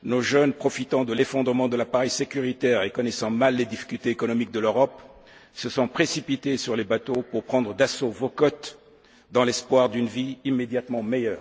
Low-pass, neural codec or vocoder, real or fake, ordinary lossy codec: none; none; real; none